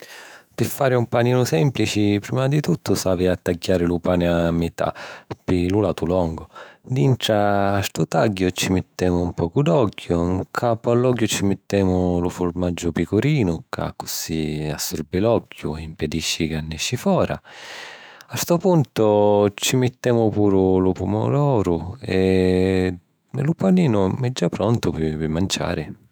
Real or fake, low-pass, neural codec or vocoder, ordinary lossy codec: fake; none; autoencoder, 48 kHz, 128 numbers a frame, DAC-VAE, trained on Japanese speech; none